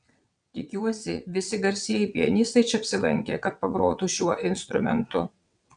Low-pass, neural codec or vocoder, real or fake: 9.9 kHz; vocoder, 22.05 kHz, 80 mel bands, WaveNeXt; fake